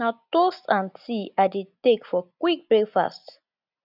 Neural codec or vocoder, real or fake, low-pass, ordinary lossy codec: none; real; 5.4 kHz; none